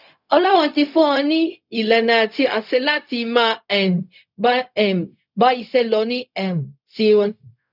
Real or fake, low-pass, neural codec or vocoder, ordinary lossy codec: fake; 5.4 kHz; codec, 16 kHz, 0.4 kbps, LongCat-Audio-Codec; none